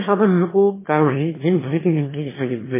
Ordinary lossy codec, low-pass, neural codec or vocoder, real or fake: MP3, 16 kbps; 3.6 kHz; autoencoder, 22.05 kHz, a latent of 192 numbers a frame, VITS, trained on one speaker; fake